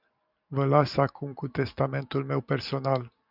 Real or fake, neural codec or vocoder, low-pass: real; none; 5.4 kHz